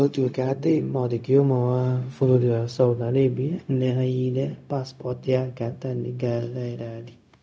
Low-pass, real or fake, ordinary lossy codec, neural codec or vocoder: none; fake; none; codec, 16 kHz, 0.4 kbps, LongCat-Audio-Codec